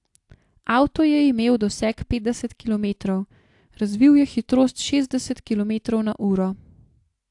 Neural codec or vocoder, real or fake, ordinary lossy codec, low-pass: none; real; AAC, 64 kbps; 10.8 kHz